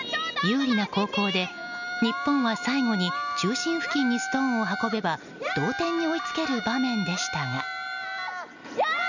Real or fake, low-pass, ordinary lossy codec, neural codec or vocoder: real; 7.2 kHz; none; none